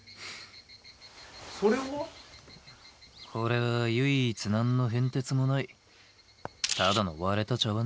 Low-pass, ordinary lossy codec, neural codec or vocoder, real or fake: none; none; none; real